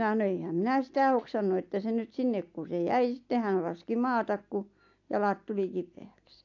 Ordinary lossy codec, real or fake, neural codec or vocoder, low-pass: AAC, 48 kbps; real; none; 7.2 kHz